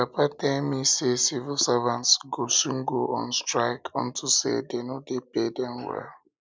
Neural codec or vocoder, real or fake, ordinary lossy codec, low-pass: none; real; none; none